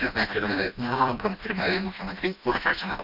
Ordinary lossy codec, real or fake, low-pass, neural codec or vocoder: none; fake; 5.4 kHz; codec, 16 kHz, 1 kbps, FreqCodec, smaller model